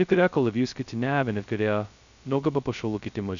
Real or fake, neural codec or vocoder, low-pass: fake; codec, 16 kHz, 0.2 kbps, FocalCodec; 7.2 kHz